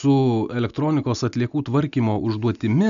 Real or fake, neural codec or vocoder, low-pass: real; none; 7.2 kHz